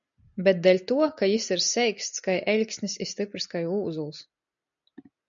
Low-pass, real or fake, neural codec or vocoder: 7.2 kHz; real; none